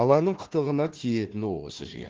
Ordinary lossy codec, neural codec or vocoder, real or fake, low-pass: Opus, 16 kbps; codec, 16 kHz, 1 kbps, FunCodec, trained on Chinese and English, 50 frames a second; fake; 7.2 kHz